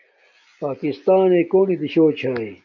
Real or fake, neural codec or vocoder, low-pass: real; none; 7.2 kHz